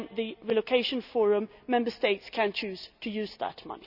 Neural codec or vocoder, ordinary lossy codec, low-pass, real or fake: none; none; 5.4 kHz; real